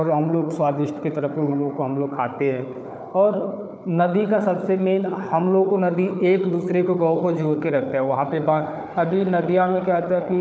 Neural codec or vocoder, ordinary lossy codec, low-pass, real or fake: codec, 16 kHz, 4 kbps, FunCodec, trained on Chinese and English, 50 frames a second; none; none; fake